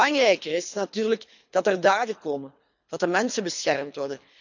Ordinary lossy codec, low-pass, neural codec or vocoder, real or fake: none; 7.2 kHz; codec, 24 kHz, 3 kbps, HILCodec; fake